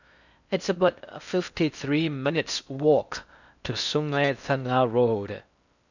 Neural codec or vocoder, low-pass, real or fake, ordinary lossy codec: codec, 16 kHz in and 24 kHz out, 0.6 kbps, FocalCodec, streaming, 4096 codes; 7.2 kHz; fake; none